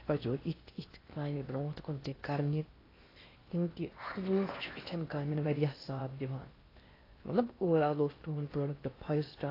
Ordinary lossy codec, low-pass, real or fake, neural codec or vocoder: AAC, 24 kbps; 5.4 kHz; fake; codec, 16 kHz in and 24 kHz out, 0.8 kbps, FocalCodec, streaming, 65536 codes